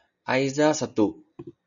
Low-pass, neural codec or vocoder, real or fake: 7.2 kHz; none; real